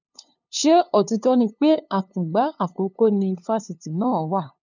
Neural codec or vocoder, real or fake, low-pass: codec, 16 kHz, 2 kbps, FunCodec, trained on LibriTTS, 25 frames a second; fake; 7.2 kHz